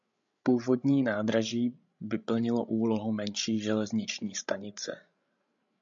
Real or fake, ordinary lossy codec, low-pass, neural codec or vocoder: fake; AAC, 64 kbps; 7.2 kHz; codec, 16 kHz, 16 kbps, FreqCodec, larger model